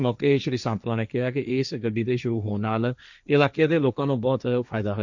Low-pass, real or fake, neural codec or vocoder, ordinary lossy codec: 7.2 kHz; fake; codec, 16 kHz, 1.1 kbps, Voila-Tokenizer; none